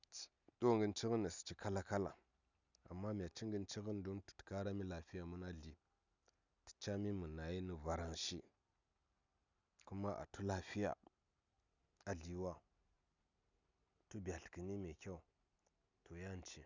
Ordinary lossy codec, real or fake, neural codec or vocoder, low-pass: none; real; none; 7.2 kHz